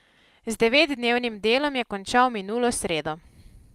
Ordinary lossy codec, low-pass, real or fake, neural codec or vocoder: Opus, 32 kbps; 14.4 kHz; real; none